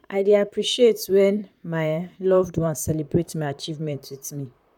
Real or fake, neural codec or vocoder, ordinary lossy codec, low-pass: fake; vocoder, 44.1 kHz, 128 mel bands, Pupu-Vocoder; none; 19.8 kHz